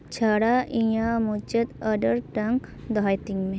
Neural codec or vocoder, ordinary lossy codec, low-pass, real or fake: none; none; none; real